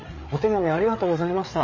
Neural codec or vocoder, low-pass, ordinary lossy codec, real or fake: codec, 16 kHz, 8 kbps, FreqCodec, larger model; 7.2 kHz; MP3, 32 kbps; fake